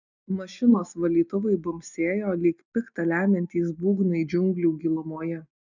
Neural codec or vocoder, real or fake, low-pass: none; real; 7.2 kHz